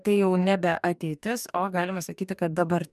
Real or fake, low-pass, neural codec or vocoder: fake; 14.4 kHz; codec, 44.1 kHz, 2.6 kbps, DAC